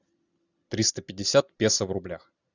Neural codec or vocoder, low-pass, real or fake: none; 7.2 kHz; real